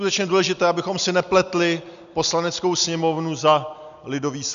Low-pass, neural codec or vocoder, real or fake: 7.2 kHz; none; real